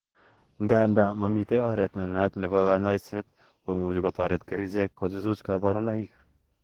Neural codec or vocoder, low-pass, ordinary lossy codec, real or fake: codec, 44.1 kHz, 2.6 kbps, DAC; 19.8 kHz; Opus, 16 kbps; fake